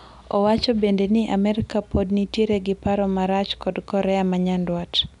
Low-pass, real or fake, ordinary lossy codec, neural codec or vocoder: 10.8 kHz; real; none; none